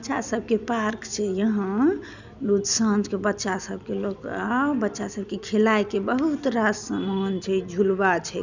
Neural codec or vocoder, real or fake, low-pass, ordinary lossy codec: none; real; 7.2 kHz; none